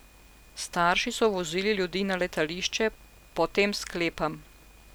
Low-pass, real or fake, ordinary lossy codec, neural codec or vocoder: none; real; none; none